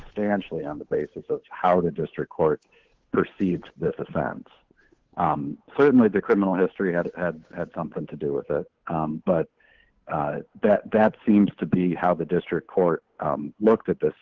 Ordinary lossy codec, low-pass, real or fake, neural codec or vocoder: Opus, 16 kbps; 7.2 kHz; fake; codec, 16 kHz, 8 kbps, FunCodec, trained on Chinese and English, 25 frames a second